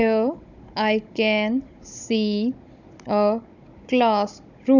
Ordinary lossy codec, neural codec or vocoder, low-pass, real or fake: none; codec, 16 kHz, 16 kbps, FunCodec, trained on Chinese and English, 50 frames a second; 7.2 kHz; fake